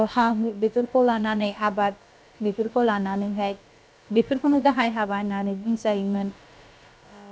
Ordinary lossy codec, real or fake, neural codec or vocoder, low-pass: none; fake; codec, 16 kHz, about 1 kbps, DyCAST, with the encoder's durations; none